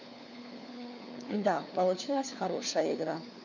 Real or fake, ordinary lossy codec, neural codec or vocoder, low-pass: fake; none; codec, 16 kHz, 4 kbps, FunCodec, trained on LibriTTS, 50 frames a second; 7.2 kHz